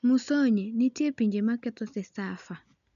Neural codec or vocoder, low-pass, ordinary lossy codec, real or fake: none; 7.2 kHz; MP3, 96 kbps; real